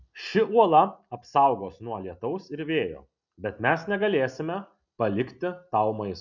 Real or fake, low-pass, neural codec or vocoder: real; 7.2 kHz; none